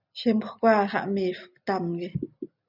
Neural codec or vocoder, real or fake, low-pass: none; real; 5.4 kHz